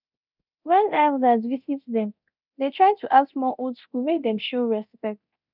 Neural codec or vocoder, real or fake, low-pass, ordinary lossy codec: codec, 24 kHz, 0.9 kbps, DualCodec; fake; 5.4 kHz; none